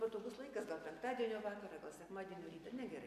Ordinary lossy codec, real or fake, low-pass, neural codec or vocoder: MP3, 64 kbps; real; 14.4 kHz; none